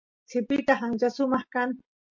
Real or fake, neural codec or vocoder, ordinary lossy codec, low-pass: real; none; MP3, 48 kbps; 7.2 kHz